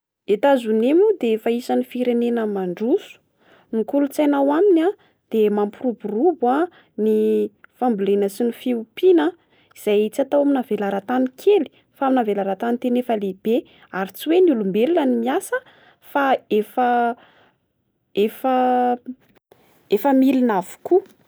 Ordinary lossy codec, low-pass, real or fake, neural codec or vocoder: none; none; real; none